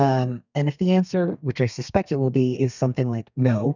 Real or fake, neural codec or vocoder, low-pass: fake; codec, 32 kHz, 1.9 kbps, SNAC; 7.2 kHz